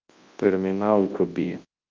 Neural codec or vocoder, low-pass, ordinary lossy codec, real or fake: codec, 24 kHz, 0.9 kbps, WavTokenizer, large speech release; 7.2 kHz; Opus, 24 kbps; fake